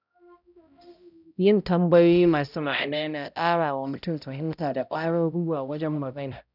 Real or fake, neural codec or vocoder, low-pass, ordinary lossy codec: fake; codec, 16 kHz, 0.5 kbps, X-Codec, HuBERT features, trained on balanced general audio; 5.4 kHz; none